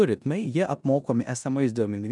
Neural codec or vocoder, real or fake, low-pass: codec, 16 kHz in and 24 kHz out, 0.9 kbps, LongCat-Audio-Codec, four codebook decoder; fake; 10.8 kHz